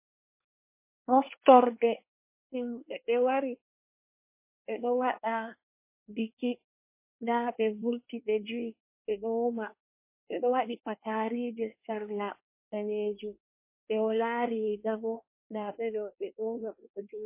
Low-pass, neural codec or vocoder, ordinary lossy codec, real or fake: 3.6 kHz; codec, 24 kHz, 1 kbps, SNAC; MP3, 24 kbps; fake